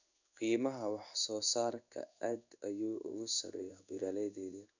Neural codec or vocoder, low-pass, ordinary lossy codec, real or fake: codec, 16 kHz in and 24 kHz out, 1 kbps, XY-Tokenizer; 7.2 kHz; none; fake